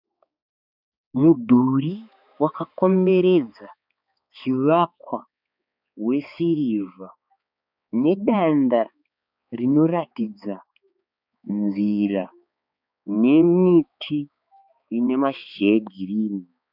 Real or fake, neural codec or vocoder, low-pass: fake; codec, 16 kHz, 4 kbps, X-Codec, HuBERT features, trained on balanced general audio; 5.4 kHz